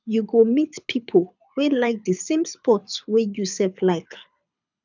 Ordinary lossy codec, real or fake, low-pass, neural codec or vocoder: none; fake; 7.2 kHz; codec, 24 kHz, 6 kbps, HILCodec